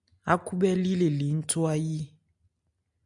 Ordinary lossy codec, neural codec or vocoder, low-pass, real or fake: MP3, 96 kbps; none; 10.8 kHz; real